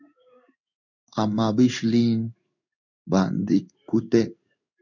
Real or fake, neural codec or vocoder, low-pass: fake; codec, 16 kHz in and 24 kHz out, 1 kbps, XY-Tokenizer; 7.2 kHz